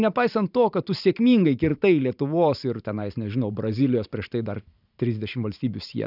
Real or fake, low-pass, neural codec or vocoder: fake; 5.4 kHz; vocoder, 44.1 kHz, 128 mel bands every 512 samples, BigVGAN v2